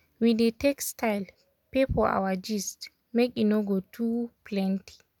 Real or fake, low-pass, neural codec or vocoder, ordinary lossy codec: real; 19.8 kHz; none; none